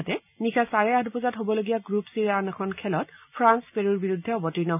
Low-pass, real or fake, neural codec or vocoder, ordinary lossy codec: 3.6 kHz; real; none; none